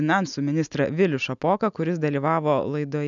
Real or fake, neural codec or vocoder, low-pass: real; none; 7.2 kHz